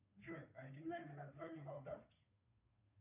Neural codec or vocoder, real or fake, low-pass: codec, 16 kHz, 2 kbps, FunCodec, trained on Chinese and English, 25 frames a second; fake; 3.6 kHz